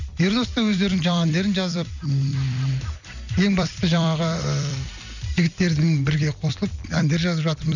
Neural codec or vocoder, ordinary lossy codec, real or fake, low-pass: vocoder, 44.1 kHz, 128 mel bands every 256 samples, BigVGAN v2; none; fake; 7.2 kHz